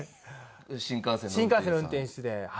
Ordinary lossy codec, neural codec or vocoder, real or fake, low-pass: none; none; real; none